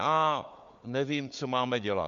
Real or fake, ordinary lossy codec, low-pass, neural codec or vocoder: fake; MP3, 48 kbps; 7.2 kHz; codec, 16 kHz, 4 kbps, FunCodec, trained on Chinese and English, 50 frames a second